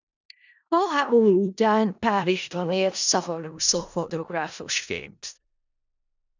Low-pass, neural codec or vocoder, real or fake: 7.2 kHz; codec, 16 kHz in and 24 kHz out, 0.4 kbps, LongCat-Audio-Codec, four codebook decoder; fake